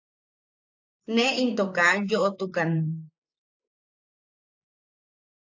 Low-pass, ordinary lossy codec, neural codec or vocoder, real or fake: 7.2 kHz; AAC, 48 kbps; vocoder, 44.1 kHz, 128 mel bands, Pupu-Vocoder; fake